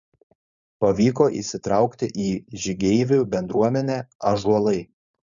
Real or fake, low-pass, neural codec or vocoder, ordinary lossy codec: fake; 7.2 kHz; codec, 16 kHz, 4.8 kbps, FACodec; MP3, 96 kbps